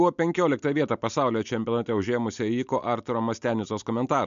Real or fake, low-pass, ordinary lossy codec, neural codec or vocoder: real; 7.2 kHz; MP3, 64 kbps; none